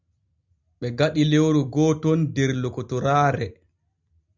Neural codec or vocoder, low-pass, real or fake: none; 7.2 kHz; real